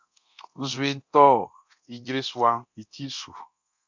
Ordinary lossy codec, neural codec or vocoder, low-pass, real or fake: MP3, 64 kbps; codec, 24 kHz, 0.9 kbps, DualCodec; 7.2 kHz; fake